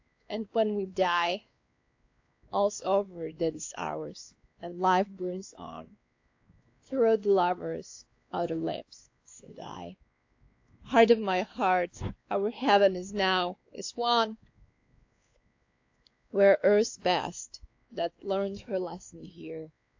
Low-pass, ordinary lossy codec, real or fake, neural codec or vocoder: 7.2 kHz; AAC, 48 kbps; fake; codec, 16 kHz, 2 kbps, X-Codec, WavLM features, trained on Multilingual LibriSpeech